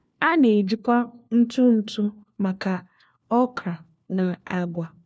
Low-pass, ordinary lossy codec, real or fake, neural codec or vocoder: none; none; fake; codec, 16 kHz, 1 kbps, FunCodec, trained on LibriTTS, 50 frames a second